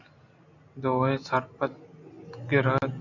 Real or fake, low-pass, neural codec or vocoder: real; 7.2 kHz; none